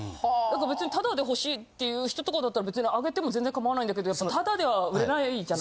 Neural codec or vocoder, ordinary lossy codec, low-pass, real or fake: none; none; none; real